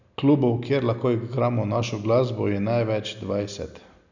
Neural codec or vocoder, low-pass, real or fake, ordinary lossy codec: none; 7.2 kHz; real; none